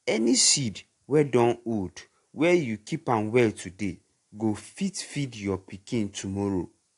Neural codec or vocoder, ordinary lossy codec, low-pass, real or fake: none; AAC, 48 kbps; 10.8 kHz; real